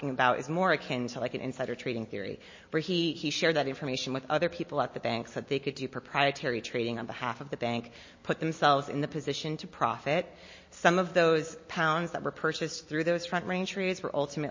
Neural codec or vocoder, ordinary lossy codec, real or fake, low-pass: none; MP3, 32 kbps; real; 7.2 kHz